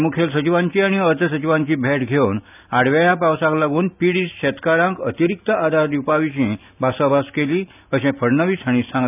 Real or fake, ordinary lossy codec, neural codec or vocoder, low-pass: real; none; none; 3.6 kHz